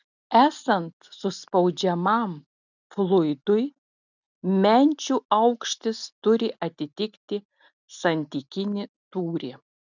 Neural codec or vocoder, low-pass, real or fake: none; 7.2 kHz; real